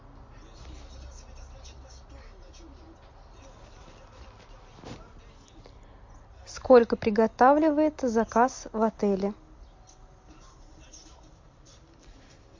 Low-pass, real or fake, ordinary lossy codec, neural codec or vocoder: 7.2 kHz; real; MP3, 48 kbps; none